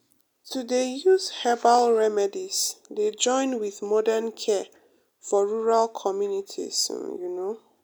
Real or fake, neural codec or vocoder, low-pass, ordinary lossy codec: real; none; none; none